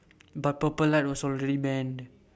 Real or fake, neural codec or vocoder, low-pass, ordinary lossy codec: real; none; none; none